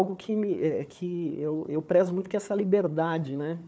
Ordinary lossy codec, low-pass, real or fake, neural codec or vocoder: none; none; fake; codec, 16 kHz, 4 kbps, FunCodec, trained on Chinese and English, 50 frames a second